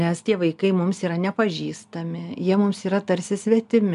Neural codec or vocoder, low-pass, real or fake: none; 10.8 kHz; real